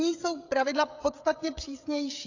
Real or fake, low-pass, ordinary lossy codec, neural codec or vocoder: fake; 7.2 kHz; AAC, 48 kbps; codec, 16 kHz, 16 kbps, FreqCodec, smaller model